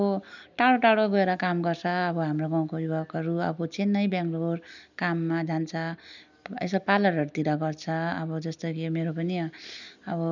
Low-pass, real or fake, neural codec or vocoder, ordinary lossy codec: 7.2 kHz; real; none; none